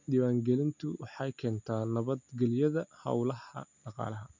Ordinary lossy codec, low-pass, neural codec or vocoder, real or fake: none; 7.2 kHz; none; real